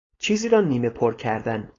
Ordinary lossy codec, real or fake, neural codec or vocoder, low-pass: AAC, 32 kbps; fake; codec, 16 kHz, 4.8 kbps, FACodec; 7.2 kHz